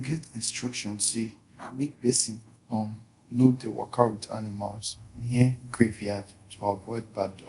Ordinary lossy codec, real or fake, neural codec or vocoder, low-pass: Opus, 64 kbps; fake; codec, 24 kHz, 0.5 kbps, DualCodec; 10.8 kHz